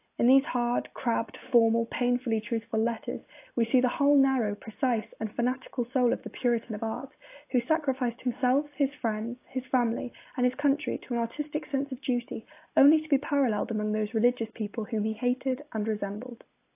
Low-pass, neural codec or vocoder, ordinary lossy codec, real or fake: 3.6 kHz; none; AAC, 24 kbps; real